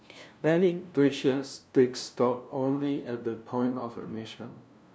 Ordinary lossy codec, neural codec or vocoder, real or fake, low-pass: none; codec, 16 kHz, 0.5 kbps, FunCodec, trained on LibriTTS, 25 frames a second; fake; none